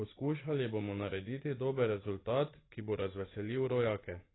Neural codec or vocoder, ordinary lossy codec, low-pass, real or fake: vocoder, 24 kHz, 100 mel bands, Vocos; AAC, 16 kbps; 7.2 kHz; fake